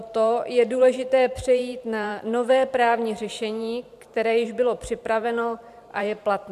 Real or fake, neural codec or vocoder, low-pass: fake; vocoder, 44.1 kHz, 128 mel bands every 512 samples, BigVGAN v2; 14.4 kHz